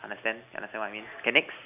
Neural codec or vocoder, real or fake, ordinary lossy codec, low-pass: none; real; none; 3.6 kHz